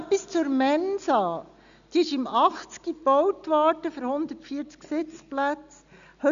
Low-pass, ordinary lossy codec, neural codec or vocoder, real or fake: 7.2 kHz; none; none; real